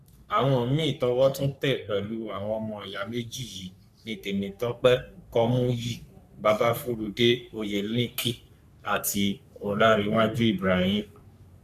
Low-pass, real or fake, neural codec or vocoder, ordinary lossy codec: 14.4 kHz; fake; codec, 44.1 kHz, 3.4 kbps, Pupu-Codec; none